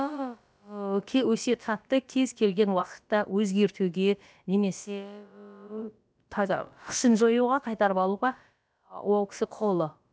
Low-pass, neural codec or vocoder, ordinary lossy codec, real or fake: none; codec, 16 kHz, about 1 kbps, DyCAST, with the encoder's durations; none; fake